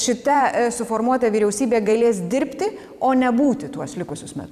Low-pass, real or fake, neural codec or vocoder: 14.4 kHz; fake; vocoder, 44.1 kHz, 128 mel bands every 256 samples, BigVGAN v2